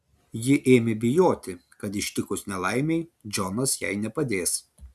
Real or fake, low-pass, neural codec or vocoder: real; 14.4 kHz; none